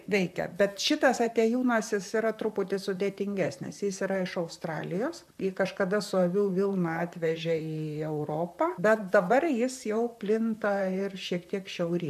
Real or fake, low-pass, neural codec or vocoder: fake; 14.4 kHz; vocoder, 44.1 kHz, 128 mel bands, Pupu-Vocoder